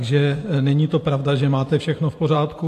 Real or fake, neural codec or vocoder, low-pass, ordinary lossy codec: fake; vocoder, 44.1 kHz, 128 mel bands every 512 samples, BigVGAN v2; 14.4 kHz; AAC, 64 kbps